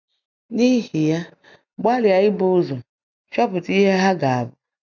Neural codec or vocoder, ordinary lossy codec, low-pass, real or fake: none; none; 7.2 kHz; real